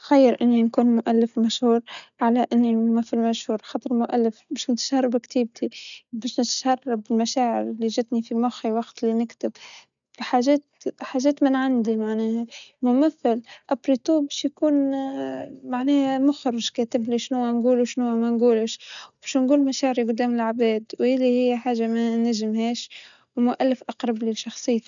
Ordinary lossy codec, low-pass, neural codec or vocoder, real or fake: none; 7.2 kHz; none; real